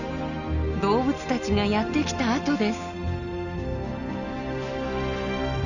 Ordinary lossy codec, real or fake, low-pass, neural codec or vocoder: none; real; 7.2 kHz; none